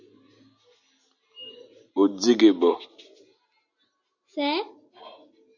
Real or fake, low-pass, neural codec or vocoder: real; 7.2 kHz; none